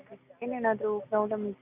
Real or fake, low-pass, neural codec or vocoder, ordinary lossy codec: real; 3.6 kHz; none; none